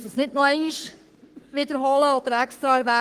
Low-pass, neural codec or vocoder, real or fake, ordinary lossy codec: 14.4 kHz; codec, 44.1 kHz, 3.4 kbps, Pupu-Codec; fake; Opus, 24 kbps